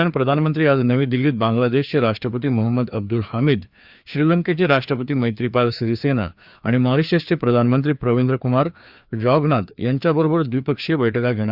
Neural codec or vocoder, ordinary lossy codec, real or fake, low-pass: codec, 16 kHz, 2 kbps, FreqCodec, larger model; Opus, 64 kbps; fake; 5.4 kHz